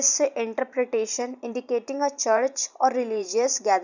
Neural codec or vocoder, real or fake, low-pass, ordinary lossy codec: vocoder, 44.1 kHz, 128 mel bands every 512 samples, BigVGAN v2; fake; 7.2 kHz; none